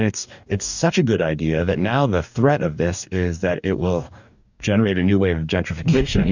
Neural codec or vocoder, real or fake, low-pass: codec, 44.1 kHz, 2.6 kbps, DAC; fake; 7.2 kHz